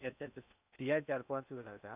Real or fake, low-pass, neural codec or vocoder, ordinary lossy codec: fake; 3.6 kHz; codec, 16 kHz in and 24 kHz out, 0.6 kbps, FocalCodec, streaming, 2048 codes; none